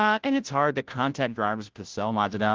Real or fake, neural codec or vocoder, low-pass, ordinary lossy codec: fake; codec, 16 kHz, 0.5 kbps, FunCodec, trained on Chinese and English, 25 frames a second; 7.2 kHz; Opus, 16 kbps